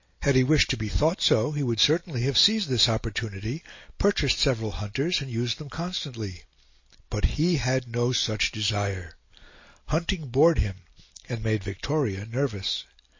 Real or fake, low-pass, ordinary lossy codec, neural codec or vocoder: real; 7.2 kHz; MP3, 32 kbps; none